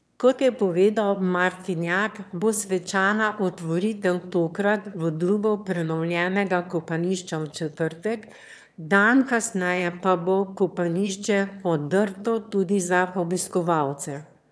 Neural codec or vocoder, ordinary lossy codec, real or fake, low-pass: autoencoder, 22.05 kHz, a latent of 192 numbers a frame, VITS, trained on one speaker; none; fake; none